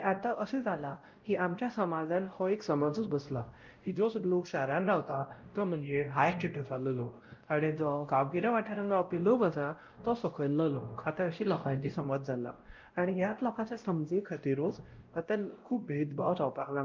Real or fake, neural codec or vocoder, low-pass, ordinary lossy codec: fake; codec, 16 kHz, 0.5 kbps, X-Codec, WavLM features, trained on Multilingual LibriSpeech; 7.2 kHz; Opus, 32 kbps